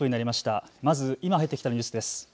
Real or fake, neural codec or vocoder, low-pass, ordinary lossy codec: real; none; none; none